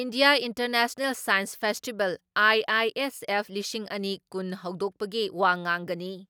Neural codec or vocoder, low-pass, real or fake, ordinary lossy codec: none; none; real; none